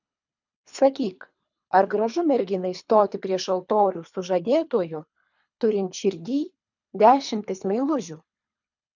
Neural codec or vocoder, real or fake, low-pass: codec, 24 kHz, 3 kbps, HILCodec; fake; 7.2 kHz